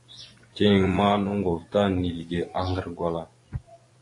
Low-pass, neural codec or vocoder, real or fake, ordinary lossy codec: 10.8 kHz; vocoder, 24 kHz, 100 mel bands, Vocos; fake; AAC, 48 kbps